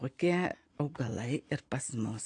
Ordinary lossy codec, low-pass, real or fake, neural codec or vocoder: AAC, 48 kbps; 9.9 kHz; real; none